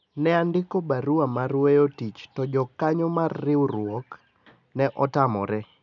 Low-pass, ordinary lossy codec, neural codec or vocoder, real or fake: 7.2 kHz; none; none; real